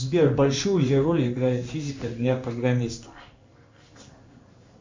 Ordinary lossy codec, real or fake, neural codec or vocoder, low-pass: MP3, 64 kbps; fake; codec, 16 kHz in and 24 kHz out, 1 kbps, XY-Tokenizer; 7.2 kHz